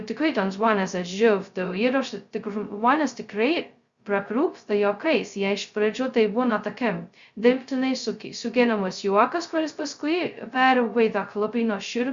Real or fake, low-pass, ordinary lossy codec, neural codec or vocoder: fake; 7.2 kHz; Opus, 64 kbps; codec, 16 kHz, 0.2 kbps, FocalCodec